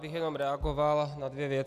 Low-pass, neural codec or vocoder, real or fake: 14.4 kHz; none; real